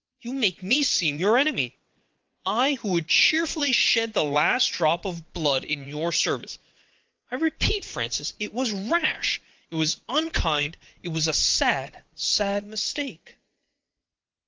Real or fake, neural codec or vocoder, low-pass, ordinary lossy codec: fake; vocoder, 22.05 kHz, 80 mel bands, WaveNeXt; 7.2 kHz; Opus, 24 kbps